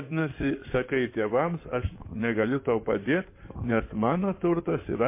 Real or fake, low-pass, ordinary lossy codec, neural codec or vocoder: fake; 3.6 kHz; MP3, 24 kbps; codec, 16 kHz in and 24 kHz out, 2.2 kbps, FireRedTTS-2 codec